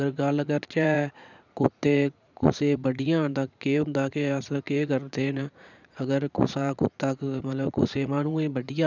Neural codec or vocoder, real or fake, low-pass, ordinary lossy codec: vocoder, 44.1 kHz, 80 mel bands, Vocos; fake; 7.2 kHz; none